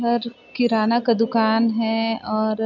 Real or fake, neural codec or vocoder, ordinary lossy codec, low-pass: real; none; none; 7.2 kHz